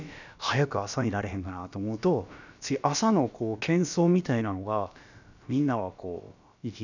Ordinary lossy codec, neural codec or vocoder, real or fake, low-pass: none; codec, 16 kHz, about 1 kbps, DyCAST, with the encoder's durations; fake; 7.2 kHz